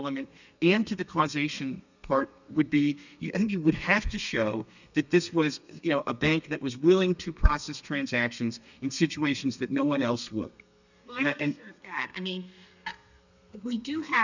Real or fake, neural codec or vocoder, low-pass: fake; codec, 32 kHz, 1.9 kbps, SNAC; 7.2 kHz